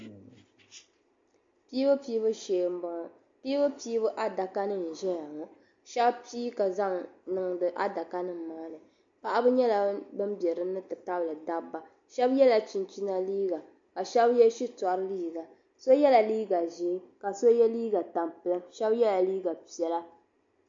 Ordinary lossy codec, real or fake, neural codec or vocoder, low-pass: MP3, 48 kbps; real; none; 7.2 kHz